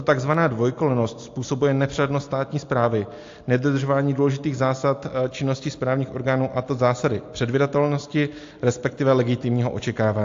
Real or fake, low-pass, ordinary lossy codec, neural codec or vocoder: real; 7.2 kHz; AAC, 48 kbps; none